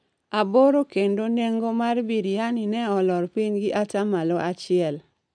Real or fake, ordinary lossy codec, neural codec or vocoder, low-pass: real; none; none; 9.9 kHz